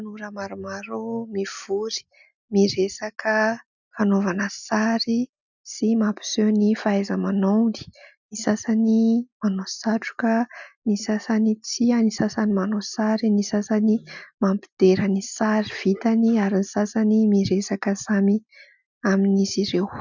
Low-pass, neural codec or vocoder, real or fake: 7.2 kHz; none; real